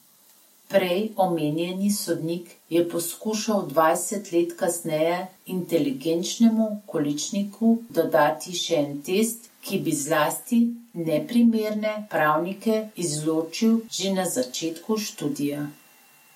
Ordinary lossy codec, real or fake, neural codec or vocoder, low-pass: MP3, 64 kbps; real; none; 19.8 kHz